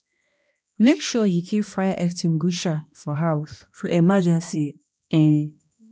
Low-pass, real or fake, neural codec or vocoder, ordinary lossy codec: none; fake; codec, 16 kHz, 1 kbps, X-Codec, HuBERT features, trained on balanced general audio; none